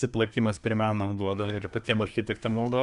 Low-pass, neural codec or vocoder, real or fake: 10.8 kHz; codec, 24 kHz, 1 kbps, SNAC; fake